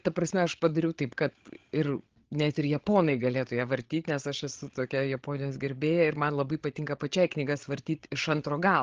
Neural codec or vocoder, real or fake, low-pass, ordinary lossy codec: codec, 16 kHz, 16 kbps, FreqCodec, larger model; fake; 7.2 kHz; Opus, 16 kbps